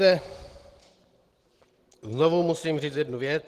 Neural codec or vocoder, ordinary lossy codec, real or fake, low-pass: none; Opus, 16 kbps; real; 14.4 kHz